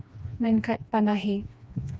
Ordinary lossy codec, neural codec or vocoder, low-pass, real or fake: none; codec, 16 kHz, 2 kbps, FreqCodec, smaller model; none; fake